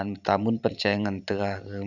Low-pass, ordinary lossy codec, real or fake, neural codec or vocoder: 7.2 kHz; none; fake; vocoder, 44.1 kHz, 128 mel bands every 512 samples, BigVGAN v2